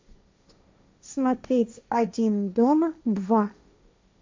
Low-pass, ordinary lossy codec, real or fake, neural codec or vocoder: 7.2 kHz; MP3, 64 kbps; fake; codec, 16 kHz, 1.1 kbps, Voila-Tokenizer